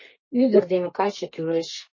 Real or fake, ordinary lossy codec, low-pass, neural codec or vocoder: fake; MP3, 32 kbps; 7.2 kHz; codec, 32 kHz, 1.9 kbps, SNAC